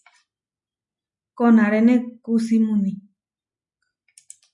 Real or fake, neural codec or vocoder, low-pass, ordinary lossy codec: real; none; 10.8 kHz; MP3, 64 kbps